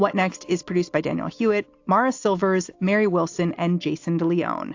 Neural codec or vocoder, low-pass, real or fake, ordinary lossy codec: none; 7.2 kHz; real; AAC, 48 kbps